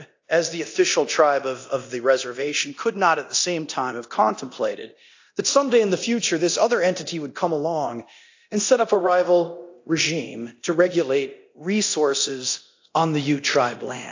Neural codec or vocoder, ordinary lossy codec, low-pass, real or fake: codec, 24 kHz, 0.9 kbps, DualCodec; AAC, 48 kbps; 7.2 kHz; fake